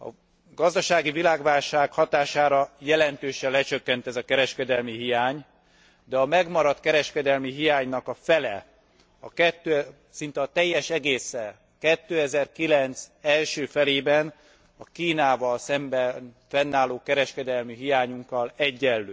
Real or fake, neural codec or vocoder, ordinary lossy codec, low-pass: real; none; none; none